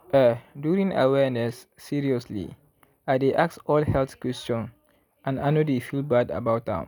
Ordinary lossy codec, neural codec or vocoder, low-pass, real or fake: none; none; none; real